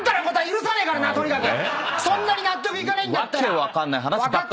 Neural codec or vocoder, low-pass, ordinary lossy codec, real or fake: none; none; none; real